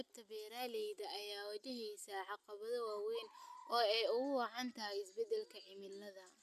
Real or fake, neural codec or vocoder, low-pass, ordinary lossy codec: real; none; 14.4 kHz; none